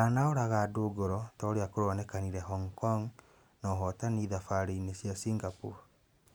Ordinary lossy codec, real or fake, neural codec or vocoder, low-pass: none; real; none; none